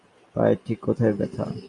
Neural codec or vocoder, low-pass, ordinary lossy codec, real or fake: none; 10.8 kHz; Opus, 64 kbps; real